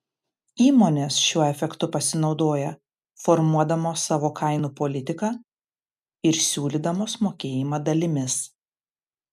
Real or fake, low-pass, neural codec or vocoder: real; 14.4 kHz; none